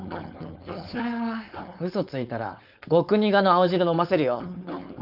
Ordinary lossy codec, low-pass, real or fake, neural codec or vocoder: none; 5.4 kHz; fake; codec, 16 kHz, 4.8 kbps, FACodec